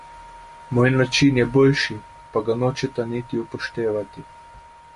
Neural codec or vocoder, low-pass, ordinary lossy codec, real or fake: none; 10.8 kHz; MP3, 48 kbps; real